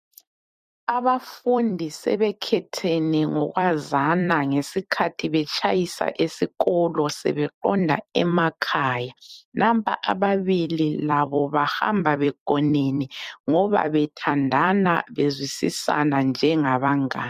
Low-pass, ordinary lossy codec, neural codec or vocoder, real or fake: 14.4 kHz; MP3, 64 kbps; vocoder, 44.1 kHz, 128 mel bands every 256 samples, BigVGAN v2; fake